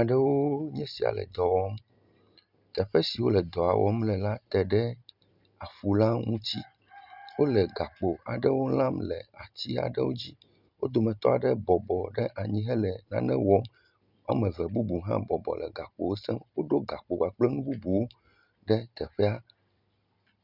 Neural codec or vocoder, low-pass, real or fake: none; 5.4 kHz; real